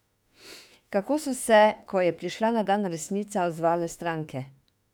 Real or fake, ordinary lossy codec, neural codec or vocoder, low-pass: fake; none; autoencoder, 48 kHz, 32 numbers a frame, DAC-VAE, trained on Japanese speech; 19.8 kHz